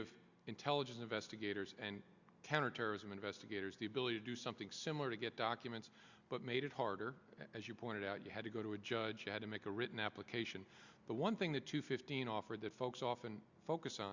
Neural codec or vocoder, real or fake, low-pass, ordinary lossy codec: none; real; 7.2 kHz; Opus, 64 kbps